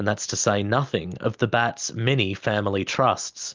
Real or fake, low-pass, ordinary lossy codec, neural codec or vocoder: real; 7.2 kHz; Opus, 32 kbps; none